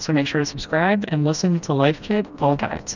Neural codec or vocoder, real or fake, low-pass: codec, 16 kHz, 1 kbps, FreqCodec, smaller model; fake; 7.2 kHz